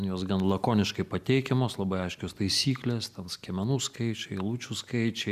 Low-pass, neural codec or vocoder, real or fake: 14.4 kHz; none; real